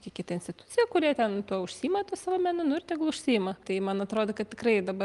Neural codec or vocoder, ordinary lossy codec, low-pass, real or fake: none; Opus, 32 kbps; 10.8 kHz; real